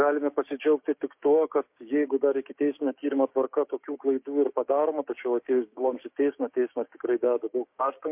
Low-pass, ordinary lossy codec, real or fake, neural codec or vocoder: 3.6 kHz; MP3, 32 kbps; fake; codec, 16 kHz, 6 kbps, DAC